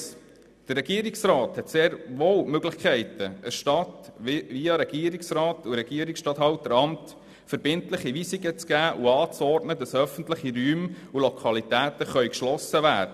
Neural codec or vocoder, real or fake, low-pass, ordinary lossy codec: none; real; 14.4 kHz; none